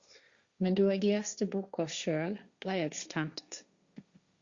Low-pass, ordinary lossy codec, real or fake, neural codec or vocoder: 7.2 kHz; Opus, 64 kbps; fake; codec, 16 kHz, 1.1 kbps, Voila-Tokenizer